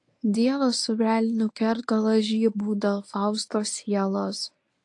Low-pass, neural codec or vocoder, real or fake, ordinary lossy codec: 10.8 kHz; codec, 24 kHz, 0.9 kbps, WavTokenizer, medium speech release version 1; fake; AAC, 48 kbps